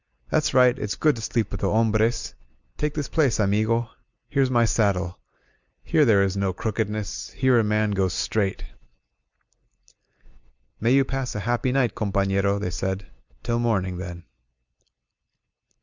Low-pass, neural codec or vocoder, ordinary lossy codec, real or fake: 7.2 kHz; none; Opus, 64 kbps; real